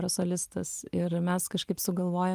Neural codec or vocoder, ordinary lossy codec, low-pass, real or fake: none; Opus, 32 kbps; 14.4 kHz; real